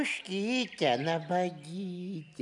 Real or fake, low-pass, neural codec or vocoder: real; 10.8 kHz; none